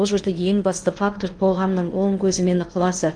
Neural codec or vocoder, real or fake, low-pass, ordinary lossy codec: codec, 16 kHz in and 24 kHz out, 0.8 kbps, FocalCodec, streaming, 65536 codes; fake; 9.9 kHz; Opus, 32 kbps